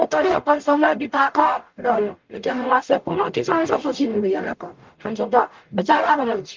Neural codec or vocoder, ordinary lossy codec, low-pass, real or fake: codec, 44.1 kHz, 0.9 kbps, DAC; Opus, 32 kbps; 7.2 kHz; fake